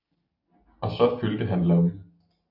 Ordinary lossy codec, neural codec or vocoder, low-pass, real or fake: AAC, 48 kbps; none; 5.4 kHz; real